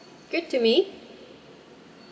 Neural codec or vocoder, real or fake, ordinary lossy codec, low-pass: none; real; none; none